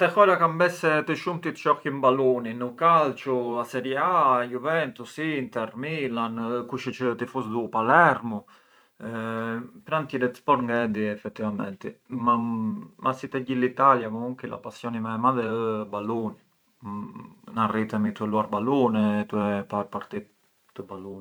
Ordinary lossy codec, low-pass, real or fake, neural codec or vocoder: none; none; fake; vocoder, 44.1 kHz, 128 mel bands every 512 samples, BigVGAN v2